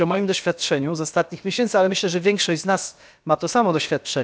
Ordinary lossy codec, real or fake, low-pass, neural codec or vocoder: none; fake; none; codec, 16 kHz, about 1 kbps, DyCAST, with the encoder's durations